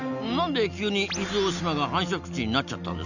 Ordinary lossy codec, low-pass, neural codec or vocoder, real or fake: none; 7.2 kHz; none; real